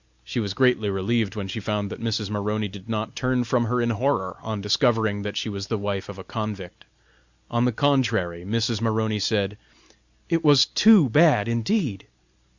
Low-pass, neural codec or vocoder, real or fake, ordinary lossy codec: 7.2 kHz; none; real; Opus, 64 kbps